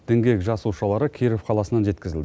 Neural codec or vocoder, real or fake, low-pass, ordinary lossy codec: none; real; none; none